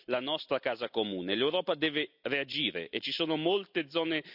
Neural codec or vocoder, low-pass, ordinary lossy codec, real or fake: none; 5.4 kHz; none; real